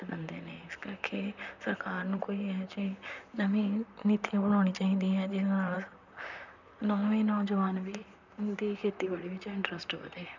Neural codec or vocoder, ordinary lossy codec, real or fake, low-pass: vocoder, 44.1 kHz, 128 mel bands, Pupu-Vocoder; none; fake; 7.2 kHz